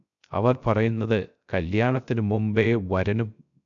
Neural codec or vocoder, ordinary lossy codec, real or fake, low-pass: codec, 16 kHz, 0.3 kbps, FocalCodec; MP3, 96 kbps; fake; 7.2 kHz